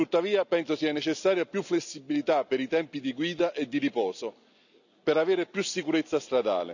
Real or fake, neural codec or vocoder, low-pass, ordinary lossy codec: real; none; 7.2 kHz; none